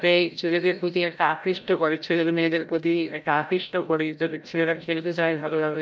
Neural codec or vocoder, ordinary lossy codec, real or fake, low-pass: codec, 16 kHz, 0.5 kbps, FreqCodec, larger model; none; fake; none